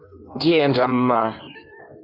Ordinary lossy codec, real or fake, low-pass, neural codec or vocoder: AAC, 48 kbps; fake; 5.4 kHz; codec, 16 kHz in and 24 kHz out, 1.1 kbps, FireRedTTS-2 codec